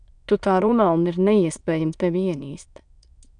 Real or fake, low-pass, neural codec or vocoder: fake; 9.9 kHz; autoencoder, 22.05 kHz, a latent of 192 numbers a frame, VITS, trained on many speakers